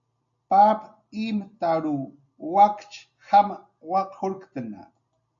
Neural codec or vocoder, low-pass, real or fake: none; 7.2 kHz; real